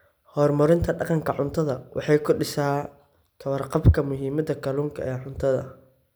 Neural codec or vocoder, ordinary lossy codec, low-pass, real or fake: none; none; none; real